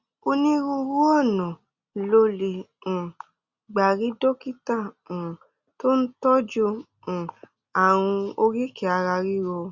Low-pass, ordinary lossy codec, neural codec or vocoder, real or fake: 7.2 kHz; Opus, 64 kbps; none; real